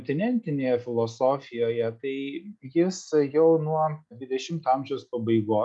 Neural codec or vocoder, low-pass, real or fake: none; 10.8 kHz; real